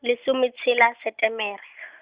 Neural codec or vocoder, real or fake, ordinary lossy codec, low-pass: none; real; Opus, 64 kbps; 3.6 kHz